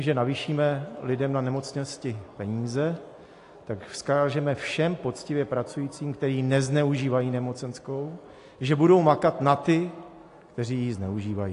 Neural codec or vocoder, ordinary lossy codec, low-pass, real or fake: none; AAC, 48 kbps; 10.8 kHz; real